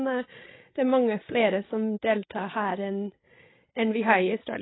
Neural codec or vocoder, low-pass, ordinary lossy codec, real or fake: codec, 16 kHz, 16 kbps, FreqCodec, larger model; 7.2 kHz; AAC, 16 kbps; fake